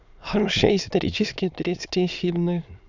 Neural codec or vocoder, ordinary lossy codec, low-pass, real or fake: autoencoder, 22.05 kHz, a latent of 192 numbers a frame, VITS, trained on many speakers; none; 7.2 kHz; fake